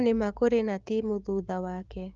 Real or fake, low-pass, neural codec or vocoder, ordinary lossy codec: fake; 7.2 kHz; codec, 16 kHz, 6 kbps, DAC; Opus, 32 kbps